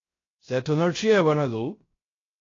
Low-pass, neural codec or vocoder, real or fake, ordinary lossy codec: 7.2 kHz; codec, 16 kHz, 0.3 kbps, FocalCodec; fake; AAC, 32 kbps